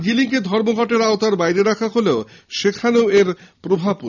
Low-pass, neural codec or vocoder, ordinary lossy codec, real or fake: 7.2 kHz; none; none; real